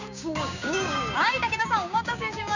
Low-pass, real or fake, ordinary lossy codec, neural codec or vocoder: 7.2 kHz; fake; none; codec, 16 kHz, 6 kbps, DAC